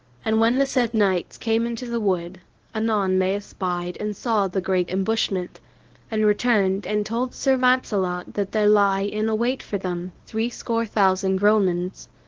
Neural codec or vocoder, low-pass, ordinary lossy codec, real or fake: codec, 24 kHz, 0.9 kbps, WavTokenizer, medium speech release version 1; 7.2 kHz; Opus, 16 kbps; fake